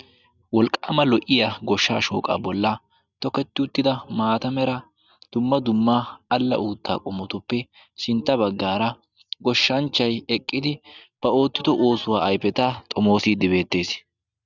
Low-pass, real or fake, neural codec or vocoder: 7.2 kHz; real; none